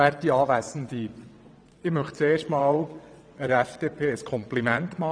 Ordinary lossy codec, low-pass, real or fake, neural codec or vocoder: none; 9.9 kHz; fake; vocoder, 22.05 kHz, 80 mel bands, WaveNeXt